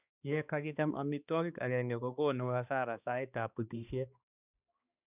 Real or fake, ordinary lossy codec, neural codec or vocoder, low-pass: fake; none; codec, 16 kHz, 2 kbps, X-Codec, HuBERT features, trained on balanced general audio; 3.6 kHz